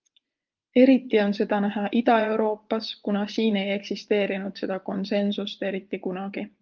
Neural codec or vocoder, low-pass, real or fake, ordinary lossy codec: vocoder, 24 kHz, 100 mel bands, Vocos; 7.2 kHz; fake; Opus, 32 kbps